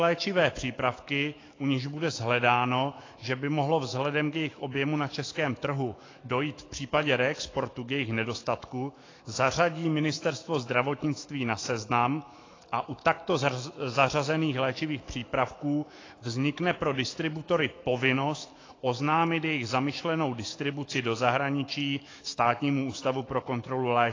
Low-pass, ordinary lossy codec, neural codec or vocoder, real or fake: 7.2 kHz; AAC, 32 kbps; autoencoder, 48 kHz, 128 numbers a frame, DAC-VAE, trained on Japanese speech; fake